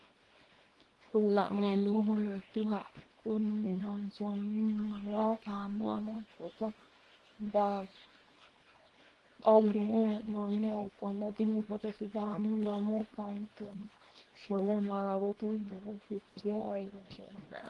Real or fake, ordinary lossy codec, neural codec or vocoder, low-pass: fake; Opus, 24 kbps; codec, 24 kHz, 0.9 kbps, WavTokenizer, small release; 10.8 kHz